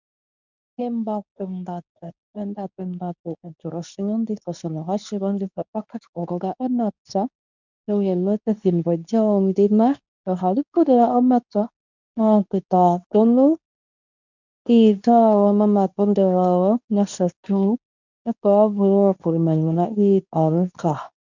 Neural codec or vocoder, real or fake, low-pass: codec, 24 kHz, 0.9 kbps, WavTokenizer, medium speech release version 2; fake; 7.2 kHz